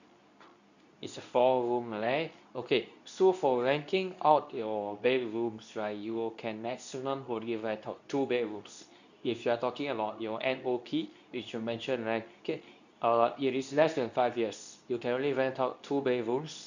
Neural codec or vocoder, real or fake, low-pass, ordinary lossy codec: codec, 24 kHz, 0.9 kbps, WavTokenizer, medium speech release version 2; fake; 7.2 kHz; none